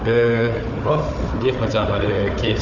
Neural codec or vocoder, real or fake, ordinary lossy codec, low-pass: codec, 16 kHz, 4 kbps, FunCodec, trained on Chinese and English, 50 frames a second; fake; none; 7.2 kHz